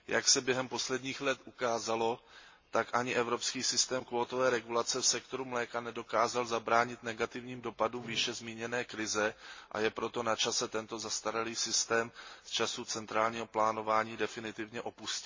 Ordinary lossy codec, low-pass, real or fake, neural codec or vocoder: MP3, 32 kbps; 7.2 kHz; real; none